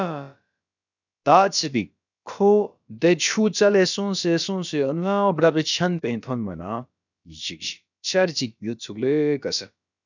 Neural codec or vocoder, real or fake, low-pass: codec, 16 kHz, about 1 kbps, DyCAST, with the encoder's durations; fake; 7.2 kHz